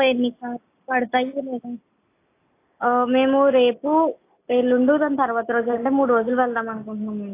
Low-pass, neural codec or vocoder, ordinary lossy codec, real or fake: 3.6 kHz; none; AAC, 24 kbps; real